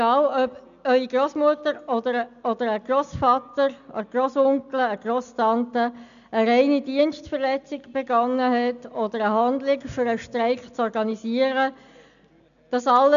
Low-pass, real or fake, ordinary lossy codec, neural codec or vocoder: 7.2 kHz; real; none; none